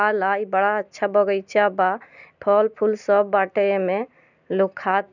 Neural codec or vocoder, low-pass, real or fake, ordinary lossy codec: none; 7.2 kHz; real; none